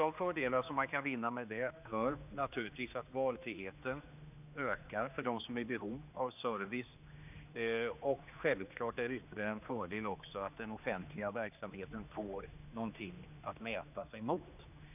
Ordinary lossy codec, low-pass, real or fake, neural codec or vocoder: none; 3.6 kHz; fake; codec, 16 kHz, 2 kbps, X-Codec, HuBERT features, trained on general audio